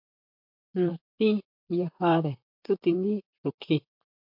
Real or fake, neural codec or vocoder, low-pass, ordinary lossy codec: fake; vocoder, 44.1 kHz, 128 mel bands, Pupu-Vocoder; 5.4 kHz; MP3, 48 kbps